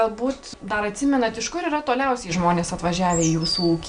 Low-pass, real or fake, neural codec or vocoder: 9.9 kHz; real; none